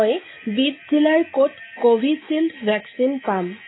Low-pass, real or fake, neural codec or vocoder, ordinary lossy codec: 7.2 kHz; real; none; AAC, 16 kbps